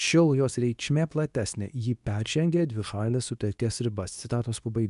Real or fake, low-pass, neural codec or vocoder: fake; 10.8 kHz; codec, 24 kHz, 0.9 kbps, WavTokenizer, medium speech release version 2